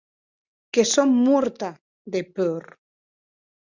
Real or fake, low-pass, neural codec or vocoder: real; 7.2 kHz; none